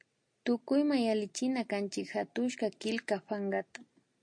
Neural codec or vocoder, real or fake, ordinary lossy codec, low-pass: none; real; MP3, 48 kbps; 9.9 kHz